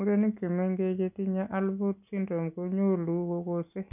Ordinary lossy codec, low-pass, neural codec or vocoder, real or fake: none; 3.6 kHz; none; real